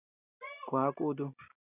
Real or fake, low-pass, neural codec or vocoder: real; 3.6 kHz; none